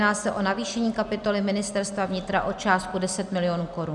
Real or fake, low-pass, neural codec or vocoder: real; 10.8 kHz; none